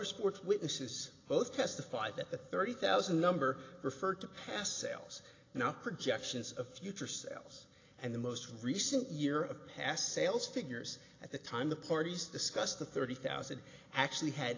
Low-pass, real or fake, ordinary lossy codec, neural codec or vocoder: 7.2 kHz; real; AAC, 32 kbps; none